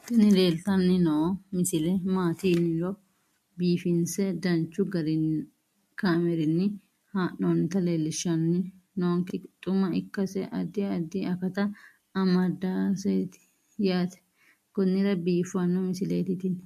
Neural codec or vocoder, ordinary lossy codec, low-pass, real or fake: none; MP3, 64 kbps; 14.4 kHz; real